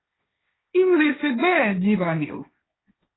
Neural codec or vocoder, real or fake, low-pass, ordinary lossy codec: codec, 16 kHz, 1.1 kbps, Voila-Tokenizer; fake; 7.2 kHz; AAC, 16 kbps